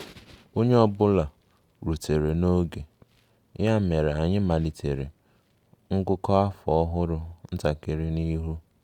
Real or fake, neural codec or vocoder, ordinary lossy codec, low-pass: real; none; Opus, 64 kbps; 19.8 kHz